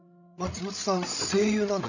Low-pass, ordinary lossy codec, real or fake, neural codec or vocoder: 7.2 kHz; none; fake; codec, 16 kHz, 16 kbps, FreqCodec, larger model